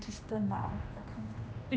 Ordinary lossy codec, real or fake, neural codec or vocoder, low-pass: none; fake; codec, 16 kHz, 1 kbps, X-Codec, HuBERT features, trained on general audio; none